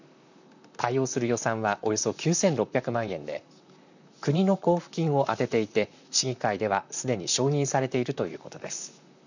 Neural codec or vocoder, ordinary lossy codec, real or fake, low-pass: vocoder, 44.1 kHz, 128 mel bands, Pupu-Vocoder; none; fake; 7.2 kHz